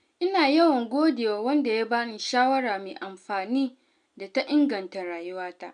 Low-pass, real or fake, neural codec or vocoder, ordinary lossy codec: 9.9 kHz; real; none; MP3, 96 kbps